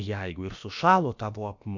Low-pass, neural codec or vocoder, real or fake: 7.2 kHz; codec, 16 kHz, about 1 kbps, DyCAST, with the encoder's durations; fake